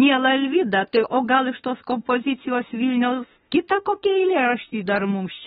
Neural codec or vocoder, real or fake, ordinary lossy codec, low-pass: autoencoder, 48 kHz, 32 numbers a frame, DAC-VAE, trained on Japanese speech; fake; AAC, 16 kbps; 19.8 kHz